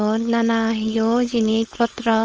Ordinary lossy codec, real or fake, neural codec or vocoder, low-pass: Opus, 24 kbps; fake; codec, 16 kHz, 4.8 kbps, FACodec; 7.2 kHz